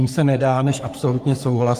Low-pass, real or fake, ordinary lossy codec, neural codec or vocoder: 14.4 kHz; fake; Opus, 32 kbps; codec, 44.1 kHz, 3.4 kbps, Pupu-Codec